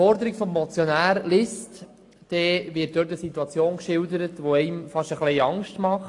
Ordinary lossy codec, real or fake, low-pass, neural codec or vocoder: AAC, 48 kbps; real; 10.8 kHz; none